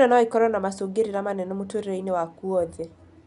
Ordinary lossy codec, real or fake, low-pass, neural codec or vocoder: none; real; 10.8 kHz; none